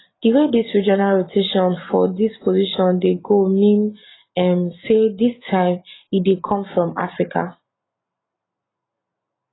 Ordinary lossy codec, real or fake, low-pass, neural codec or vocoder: AAC, 16 kbps; real; 7.2 kHz; none